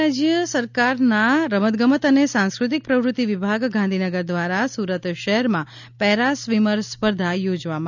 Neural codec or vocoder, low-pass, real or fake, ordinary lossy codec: none; 7.2 kHz; real; none